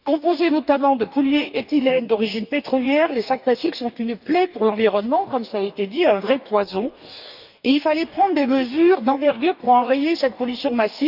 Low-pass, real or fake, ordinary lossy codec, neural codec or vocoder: 5.4 kHz; fake; none; codec, 44.1 kHz, 2.6 kbps, DAC